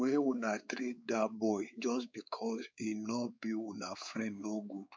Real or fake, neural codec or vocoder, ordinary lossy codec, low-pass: fake; codec, 16 kHz, 4 kbps, X-Codec, WavLM features, trained on Multilingual LibriSpeech; none; none